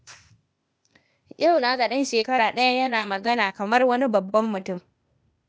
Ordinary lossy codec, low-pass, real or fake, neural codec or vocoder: none; none; fake; codec, 16 kHz, 0.8 kbps, ZipCodec